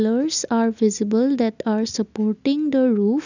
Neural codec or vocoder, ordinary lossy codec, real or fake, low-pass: none; none; real; 7.2 kHz